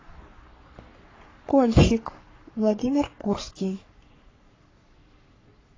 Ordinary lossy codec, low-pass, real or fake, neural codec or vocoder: AAC, 32 kbps; 7.2 kHz; fake; codec, 44.1 kHz, 3.4 kbps, Pupu-Codec